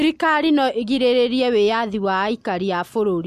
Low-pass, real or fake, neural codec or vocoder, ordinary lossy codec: 14.4 kHz; real; none; MP3, 64 kbps